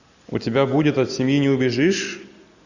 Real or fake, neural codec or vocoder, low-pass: real; none; 7.2 kHz